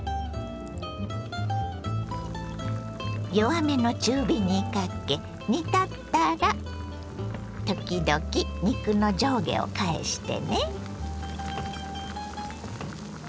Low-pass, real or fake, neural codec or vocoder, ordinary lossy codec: none; real; none; none